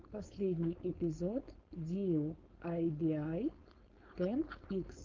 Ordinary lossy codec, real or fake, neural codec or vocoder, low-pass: Opus, 32 kbps; fake; codec, 16 kHz, 4.8 kbps, FACodec; 7.2 kHz